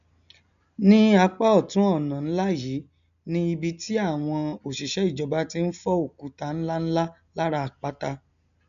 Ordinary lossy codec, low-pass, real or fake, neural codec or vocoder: none; 7.2 kHz; real; none